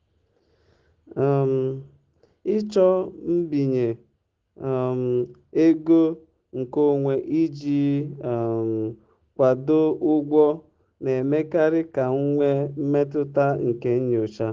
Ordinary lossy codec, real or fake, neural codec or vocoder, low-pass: Opus, 16 kbps; real; none; 7.2 kHz